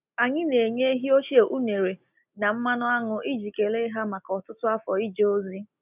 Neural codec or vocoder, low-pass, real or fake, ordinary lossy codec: none; 3.6 kHz; real; none